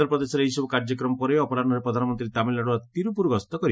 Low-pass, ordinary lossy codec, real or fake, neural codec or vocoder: none; none; real; none